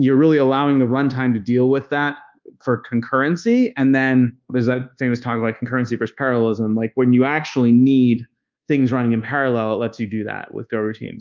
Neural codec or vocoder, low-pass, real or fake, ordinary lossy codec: codec, 24 kHz, 1.2 kbps, DualCodec; 7.2 kHz; fake; Opus, 24 kbps